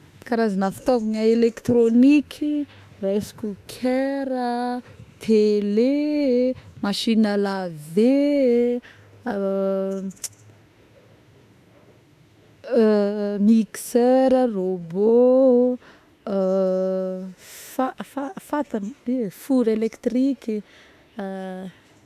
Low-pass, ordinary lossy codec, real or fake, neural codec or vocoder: 14.4 kHz; AAC, 96 kbps; fake; autoencoder, 48 kHz, 32 numbers a frame, DAC-VAE, trained on Japanese speech